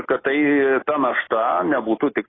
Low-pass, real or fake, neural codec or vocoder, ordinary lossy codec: 7.2 kHz; real; none; AAC, 16 kbps